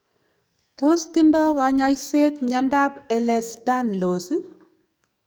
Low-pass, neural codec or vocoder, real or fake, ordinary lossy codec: none; codec, 44.1 kHz, 2.6 kbps, SNAC; fake; none